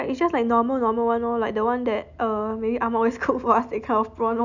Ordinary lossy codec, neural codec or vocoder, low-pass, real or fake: none; none; 7.2 kHz; real